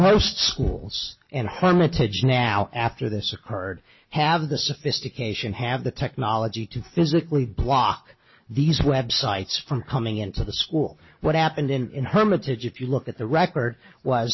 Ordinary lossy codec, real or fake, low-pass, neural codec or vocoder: MP3, 24 kbps; real; 7.2 kHz; none